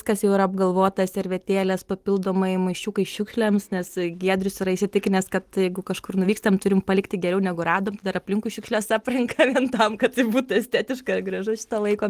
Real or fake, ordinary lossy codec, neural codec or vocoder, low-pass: real; Opus, 24 kbps; none; 14.4 kHz